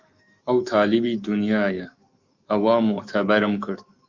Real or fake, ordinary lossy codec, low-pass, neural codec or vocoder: fake; Opus, 32 kbps; 7.2 kHz; autoencoder, 48 kHz, 128 numbers a frame, DAC-VAE, trained on Japanese speech